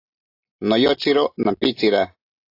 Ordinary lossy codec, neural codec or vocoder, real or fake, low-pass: AAC, 32 kbps; none; real; 5.4 kHz